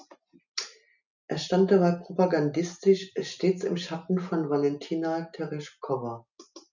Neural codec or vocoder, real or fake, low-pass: none; real; 7.2 kHz